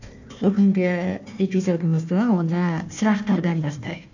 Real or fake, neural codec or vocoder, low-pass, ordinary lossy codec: fake; codec, 16 kHz, 1 kbps, FunCodec, trained on Chinese and English, 50 frames a second; 7.2 kHz; none